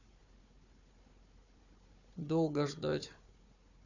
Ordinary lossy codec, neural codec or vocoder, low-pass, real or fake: none; codec, 16 kHz, 4 kbps, FunCodec, trained on Chinese and English, 50 frames a second; 7.2 kHz; fake